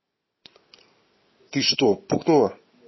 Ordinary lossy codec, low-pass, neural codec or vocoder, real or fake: MP3, 24 kbps; 7.2 kHz; none; real